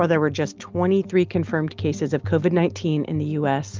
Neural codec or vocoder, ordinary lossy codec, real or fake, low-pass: none; Opus, 24 kbps; real; 7.2 kHz